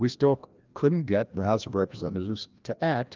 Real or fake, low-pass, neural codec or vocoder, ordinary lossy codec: fake; 7.2 kHz; codec, 16 kHz, 1 kbps, FreqCodec, larger model; Opus, 32 kbps